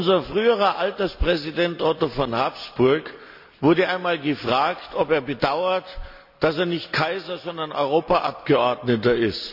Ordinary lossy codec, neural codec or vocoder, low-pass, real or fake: none; none; 5.4 kHz; real